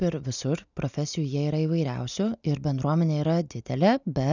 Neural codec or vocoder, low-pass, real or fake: none; 7.2 kHz; real